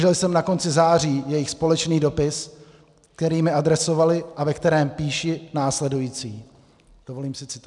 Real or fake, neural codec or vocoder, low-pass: real; none; 10.8 kHz